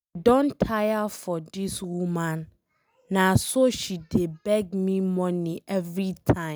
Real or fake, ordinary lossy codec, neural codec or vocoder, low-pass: real; none; none; none